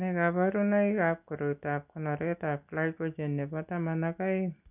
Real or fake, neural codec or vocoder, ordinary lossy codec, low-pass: real; none; none; 3.6 kHz